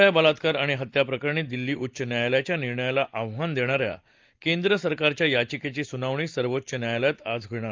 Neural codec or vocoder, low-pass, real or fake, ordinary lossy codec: none; 7.2 kHz; real; Opus, 32 kbps